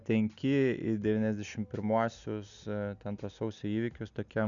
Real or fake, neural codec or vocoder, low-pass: real; none; 7.2 kHz